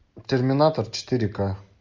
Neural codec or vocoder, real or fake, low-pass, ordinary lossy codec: none; real; 7.2 kHz; MP3, 48 kbps